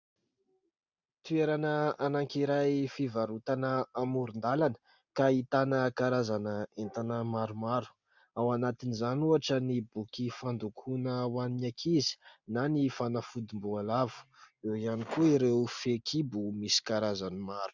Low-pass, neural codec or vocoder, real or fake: 7.2 kHz; none; real